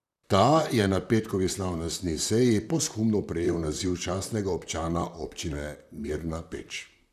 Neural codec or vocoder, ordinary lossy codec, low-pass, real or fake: vocoder, 44.1 kHz, 128 mel bands, Pupu-Vocoder; none; 14.4 kHz; fake